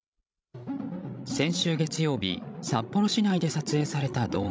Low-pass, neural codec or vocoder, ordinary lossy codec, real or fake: none; codec, 16 kHz, 16 kbps, FreqCodec, larger model; none; fake